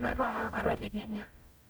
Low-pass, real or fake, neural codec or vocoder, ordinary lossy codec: none; fake; codec, 44.1 kHz, 0.9 kbps, DAC; none